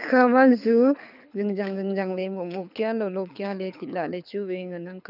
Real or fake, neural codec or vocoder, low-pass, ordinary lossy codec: fake; codec, 24 kHz, 6 kbps, HILCodec; 5.4 kHz; none